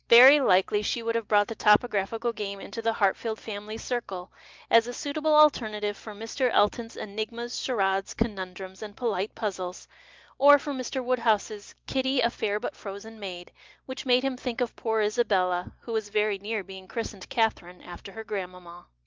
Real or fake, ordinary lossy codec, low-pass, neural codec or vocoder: real; Opus, 24 kbps; 7.2 kHz; none